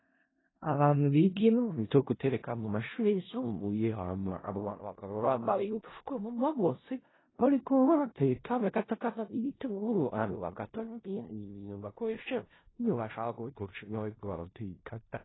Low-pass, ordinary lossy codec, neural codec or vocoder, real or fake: 7.2 kHz; AAC, 16 kbps; codec, 16 kHz in and 24 kHz out, 0.4 kbps, LongCat-Audio-Codec, four codebook decoder; fake